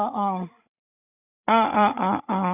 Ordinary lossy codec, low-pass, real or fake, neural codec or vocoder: none; 3.6 kHz; fake; codec, 16 kHz, 16 kbps, FreqCodec, larger model